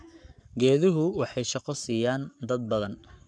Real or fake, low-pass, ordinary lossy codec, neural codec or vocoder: fake; 9.9 kHz; none; codec, 44.1 kHz, 7.8 kbps, Pupu-Codec